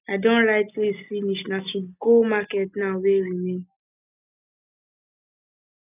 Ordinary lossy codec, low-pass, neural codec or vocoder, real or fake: none; 3.6 kHz; none; real